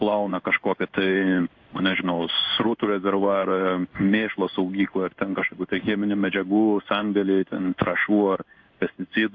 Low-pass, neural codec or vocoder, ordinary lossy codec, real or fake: 7.2 kHz; codec, 16 kHz in and 24 kHz out, 1 kbps, XY-Tokenizer; AAC, 48 kbps; fake